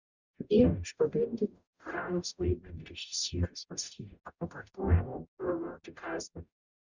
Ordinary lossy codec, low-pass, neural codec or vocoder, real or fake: Opus, 64 kbps; 7.2 kHz; codec, 44.1 kHz, 0.9 kbps, DAC; fake